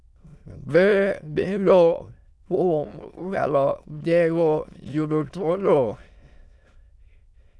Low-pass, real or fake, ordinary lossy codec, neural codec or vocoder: none; fake; none; autoencoder, 22.05 kHz, a latent of 192 numbers a frame, VITS, trained on many speakers